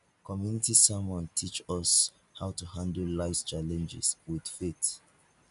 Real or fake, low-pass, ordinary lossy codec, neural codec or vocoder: real; 10.8 kHz; none; none